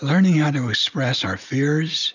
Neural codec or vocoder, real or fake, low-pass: none; real; 7.2 kHz